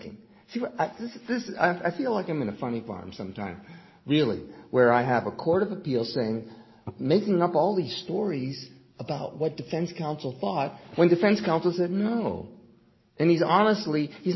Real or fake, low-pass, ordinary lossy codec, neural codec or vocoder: real; 7.2 kHz; MP3, 24 kbps; none